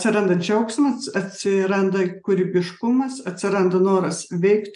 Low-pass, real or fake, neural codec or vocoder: 10.8 kHz; real; none